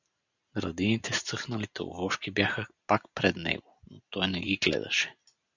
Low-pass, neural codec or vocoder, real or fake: 7.2 kHz; none; real